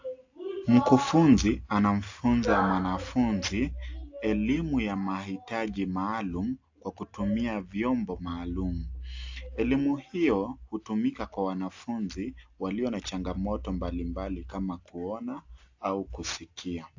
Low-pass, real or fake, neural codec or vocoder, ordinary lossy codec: 7.2 kHz; real; none; AAC, 48 kbps